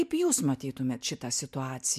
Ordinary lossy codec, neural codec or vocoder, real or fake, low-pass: MP3, 96 kbps; none; real; 14.4 kHz